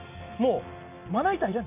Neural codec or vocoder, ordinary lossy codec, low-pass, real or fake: none; none; 3.6 kHz; real